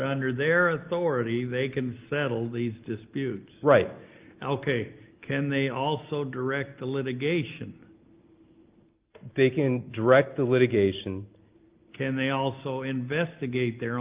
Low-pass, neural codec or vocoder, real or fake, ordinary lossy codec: 3.6 kHz; none; real; Opus, 32 kbps